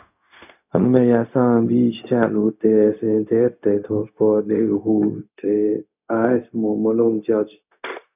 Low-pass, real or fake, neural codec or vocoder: 3.6 kHz; fake; codec, 16 kHz, 0.4 kbps, LongCat-Audio-Codec